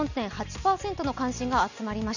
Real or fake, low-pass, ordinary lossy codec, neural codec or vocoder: real; 7.2 kHz; none; none